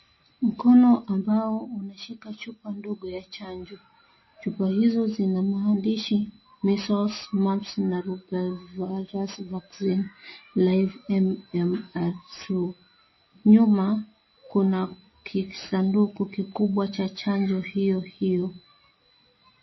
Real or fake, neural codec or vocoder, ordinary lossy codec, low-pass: real; none; MP3, 24 kbps; 7.2 kHz